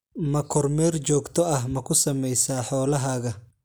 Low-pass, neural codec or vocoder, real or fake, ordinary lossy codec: none; none; real; none